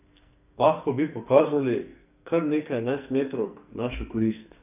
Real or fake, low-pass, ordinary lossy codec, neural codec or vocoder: fake; 3.6 kHz; none; codec, 44.1 kHz, 2.6 kbps, SNAC